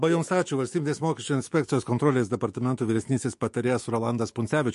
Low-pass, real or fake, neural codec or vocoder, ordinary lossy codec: 14.4 kHz; fake; autoencoder, 48 kHz, 128 numbers a frame, DAC-VAE, trained on Japanese speech; MP3, 48 kbps